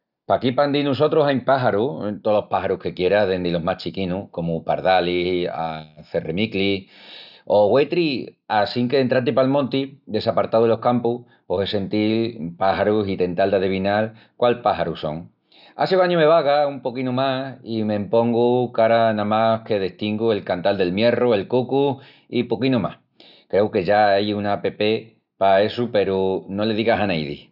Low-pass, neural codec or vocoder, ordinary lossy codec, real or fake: 5.4 kHz; none; none; real